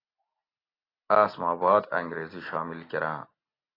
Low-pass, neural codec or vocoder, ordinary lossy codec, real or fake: 5.4 kHz; none; AAC, 24 kbps; real